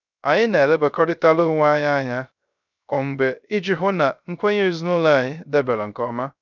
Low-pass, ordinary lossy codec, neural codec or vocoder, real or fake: 7.2 kHz; none; codec, 16 kHz, 0.3 kbps, FocalCodec; fake